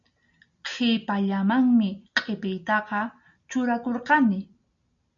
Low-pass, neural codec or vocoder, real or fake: 7.2 kHz; none; real